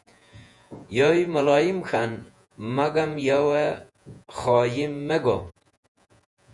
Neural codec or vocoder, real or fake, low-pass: vocoder, 48 kHz, 128 mel bands, Vocos; fake; 10.8 kHz